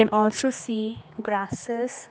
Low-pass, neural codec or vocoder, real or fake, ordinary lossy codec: none; codec, 16 kHz, 2 kbps, X-Codec, HuBERT features, trained on general audio; fake; none